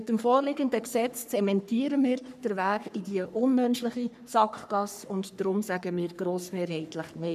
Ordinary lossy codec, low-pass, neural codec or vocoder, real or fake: none; 14.4 kHz; codec, 44.1 kHz, 3.4 kbps, Pupu-Codec; fake